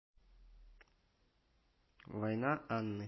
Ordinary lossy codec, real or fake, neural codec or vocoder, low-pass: MP3, 24 kbps; real; none; 7.2 kHz